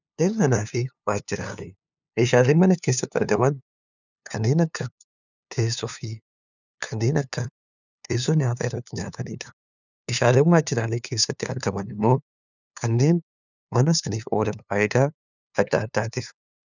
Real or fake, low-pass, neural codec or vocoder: fake; 7.2 kHz; codec, 16 kHz, 2 kbps, FunCodec, trained on LibriTTS, 25 frames a second